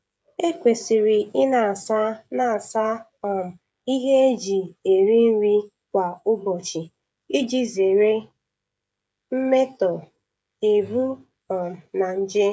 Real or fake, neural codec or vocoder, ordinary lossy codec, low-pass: fake; codec, 16 kHz, 16 kbps, FreqCodec, smaller model; none; none